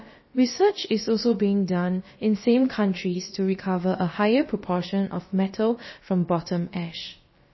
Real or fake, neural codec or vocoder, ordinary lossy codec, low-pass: fake; codec, 16 kHz, about 1 kbps, DyCAST, with the encoder's durations; MP3, 24 kbps; 7.2 kHz